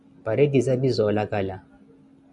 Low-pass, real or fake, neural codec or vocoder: 10.8 kHz; real; none